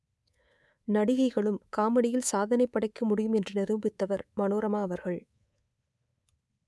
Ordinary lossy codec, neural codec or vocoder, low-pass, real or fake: none; codec, 24 kHz, 3.1 kbps, DualCodec; none; fake